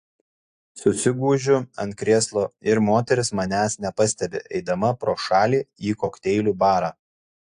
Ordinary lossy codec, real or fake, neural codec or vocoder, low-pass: AAC, 64 kbps; real; none; 9.9 kHz